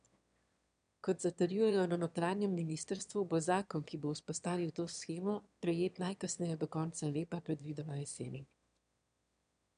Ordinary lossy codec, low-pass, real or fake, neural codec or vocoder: none; 9.9 kHz; fake; autoencoder, 22.05 kHz, a latent of 192 numbers a frame, VITS, trained on one speaker